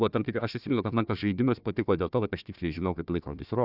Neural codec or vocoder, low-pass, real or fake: codec, 16 kHz, 1 kbps, FunCodec, trained on Chinese and English, 50 frames a second; 5.4 kHz; fake